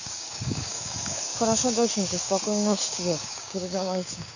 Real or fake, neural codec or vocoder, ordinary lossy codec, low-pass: fake; codec, 16 kHz, 6 kbps, DAC; none; 7.2 kHz